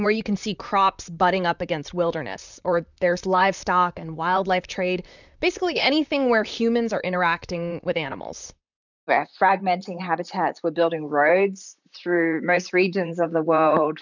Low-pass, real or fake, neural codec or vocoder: 7.2 kHz; fake; vocoder, 44.1 kHz, 128 mel bands, Pupu-Vocoder